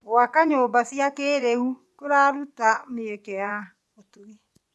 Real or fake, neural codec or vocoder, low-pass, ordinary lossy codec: fake; vocoder, 24 kHz, 100 mel bands, Vocos; none; none